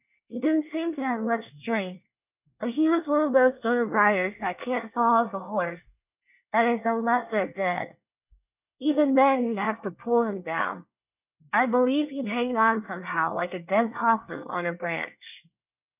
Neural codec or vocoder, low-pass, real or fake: codec, 24 kHz, 1 kbps, SNAC; 3.6 kHz; fake